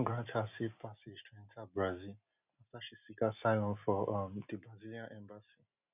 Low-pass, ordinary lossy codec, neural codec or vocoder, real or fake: 3.6 kHz; none; none; real